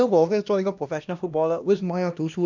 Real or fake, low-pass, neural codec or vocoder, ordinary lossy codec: fake; 7.2 kHz; codec, 16 kHz, 1 kbps, X-Codec, HuBERT features, trained on LibriSpeech; none